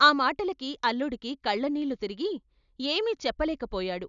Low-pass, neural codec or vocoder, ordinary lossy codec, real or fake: 7.2 kHz; none; none; real